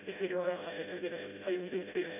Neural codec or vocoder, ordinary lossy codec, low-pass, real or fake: codec, 16 kHz, 0.5 kbps, FreqCodec, smaller model; none; 3.6 kHz; fake